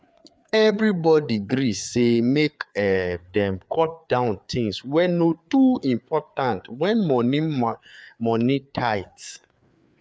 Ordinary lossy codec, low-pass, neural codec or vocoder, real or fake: none; none; codec, 16 kHz, 4 kbps, FreqCodec, larger model; fake